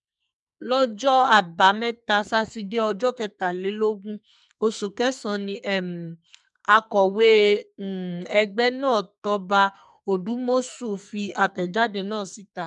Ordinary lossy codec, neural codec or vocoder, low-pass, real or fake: MP3, 96 kbps; codec, 44.1 kHz, 2.6 kbps, SNAC; 10.8 kHz; fake